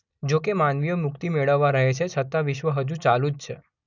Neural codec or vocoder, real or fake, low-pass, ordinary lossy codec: none; real; 7.2 kHz; none